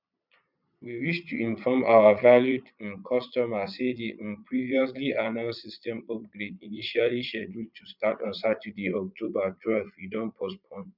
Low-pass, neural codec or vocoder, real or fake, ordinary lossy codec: 5.4 kHz; vocoder, 22.05 kHz, 80 mel bands, Vocos; fake; none